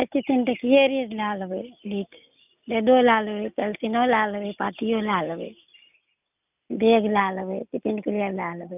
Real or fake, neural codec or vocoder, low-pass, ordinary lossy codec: real; none; 3.6 kHz; none